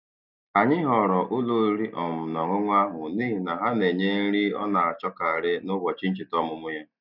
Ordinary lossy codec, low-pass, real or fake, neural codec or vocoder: none; 5.4 kHz; real; none